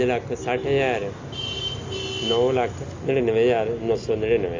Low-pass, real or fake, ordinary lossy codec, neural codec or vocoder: 7.2 kHz; real; AAC, 48 kbps; none